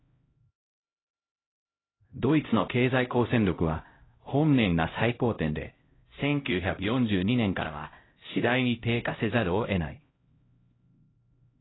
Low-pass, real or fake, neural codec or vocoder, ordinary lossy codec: 7.2 kHz; fake; codec, 16 kHz, 0.5 kbps, X-Codec, HuBERT features, trained on LibriSpeech; AAC, 16 kbps